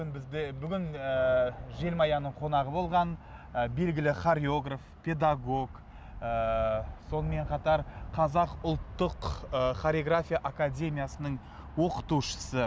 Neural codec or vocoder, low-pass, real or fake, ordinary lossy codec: none; none; real; none